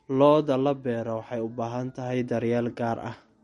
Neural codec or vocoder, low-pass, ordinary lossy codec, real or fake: none; 19.8 kHz; MP3, 48 kbps; real